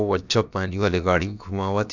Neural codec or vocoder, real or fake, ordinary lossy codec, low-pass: codec, 16 kHz, about 1 kbps, DyCAST, with the encoder's durations; fake; none; 7.2 kHz